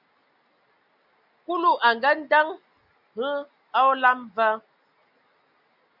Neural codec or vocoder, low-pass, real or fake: none; 5.4 kHz; real